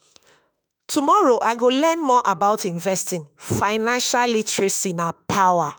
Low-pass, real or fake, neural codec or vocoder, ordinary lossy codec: none; fake; autoencoder, 48 kHz, 32 numbers a frame, DAC-VAE, trained on Japanese speech; none